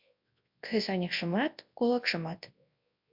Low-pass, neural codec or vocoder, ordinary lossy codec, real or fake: 5.4 kHz; codec, 24 kHz, 0.9 kbps, WavTokenizer, large speech release; MP3, 48 kbps; fake